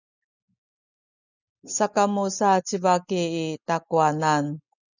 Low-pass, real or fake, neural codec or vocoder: 7.2 kHz; real; none